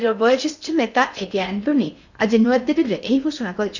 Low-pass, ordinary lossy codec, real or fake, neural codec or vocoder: 7.2 kHz; none; fake; codec, 16 kHz in and 24 kHz out, 0.8 kbps, FocalCodec, streaming, 65536 codes